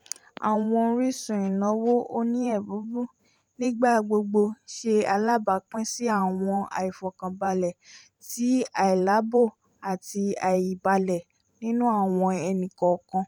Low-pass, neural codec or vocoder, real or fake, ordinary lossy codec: 19.8 kHz; vocoder, 44.1 kHz, 128 mel bands every 512 samples, BigVGAN v2; fake; none